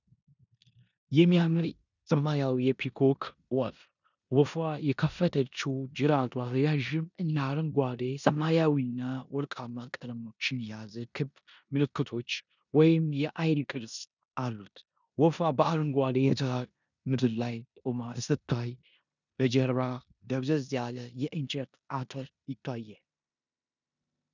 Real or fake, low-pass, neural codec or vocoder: fake; 7.2 kHz; codec, 16 kHz in and 24 kHz out, 0.9 kbps, LongCat-Audio-Codec, four codebook decoder